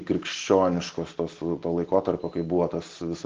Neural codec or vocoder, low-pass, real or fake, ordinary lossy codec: none; 7.2 kHz; real; Opus, 16 kbps